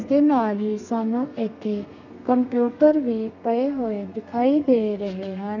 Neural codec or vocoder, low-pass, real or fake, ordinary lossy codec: codec, 32 kHz, 1.9 kbps, SNAC; 7.2 kHz; fake; none